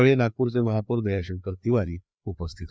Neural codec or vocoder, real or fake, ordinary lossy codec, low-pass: codec, 16 kHz, 2 kbps, FreqCodec, larger model; fake; none; none